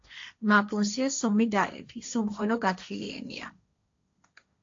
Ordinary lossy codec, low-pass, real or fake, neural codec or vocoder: AAC, 48 kbps; 7.2 kHz; fake; codec, 16 kHz, 1.1 kbps, Voila-Tokenizer